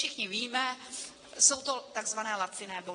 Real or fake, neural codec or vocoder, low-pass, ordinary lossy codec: fake; vocoder, 22.05 kHz, 80 mel bands, WaveNeXt; 9.9 kHz; AAC, 32 kbps